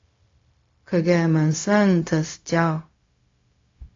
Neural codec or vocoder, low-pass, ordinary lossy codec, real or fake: codec, 16 kHz, 0.4 kbps, LongCat-Audio-Codec; 7.2 kHz; AAC, 32 kbps; fake